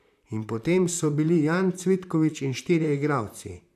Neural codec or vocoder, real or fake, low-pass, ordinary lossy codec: vocoder, 44.1 kHz, 128 mel bands, Pupu-Vocoder; fake; 14.4 kHz; none